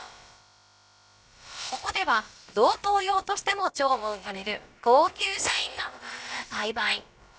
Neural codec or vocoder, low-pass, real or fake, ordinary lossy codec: codec, 16 kHz, about 1 kbps, DyCAST, with the encoder's durations; none; fake; none